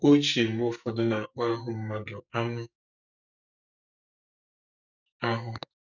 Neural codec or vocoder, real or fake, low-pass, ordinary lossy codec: codec, 44.1 kHz, 2.6 kbps, SNAC; fake; 7.2 kHz; none